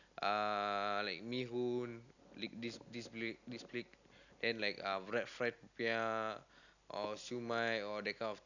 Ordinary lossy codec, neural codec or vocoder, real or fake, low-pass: none; none; real; 7.2 kHz